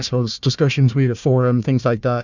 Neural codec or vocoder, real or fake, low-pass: codec, 16 kHz, 1 kbps, FunCodec, trained on Chinese and English, 50 frames a second; fake; 7.2 kHz